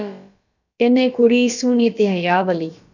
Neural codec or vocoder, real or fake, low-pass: codec, 16 kHz, about 1 kbps, DyCAST, with the encoder's durations; fake; 7.2 kHz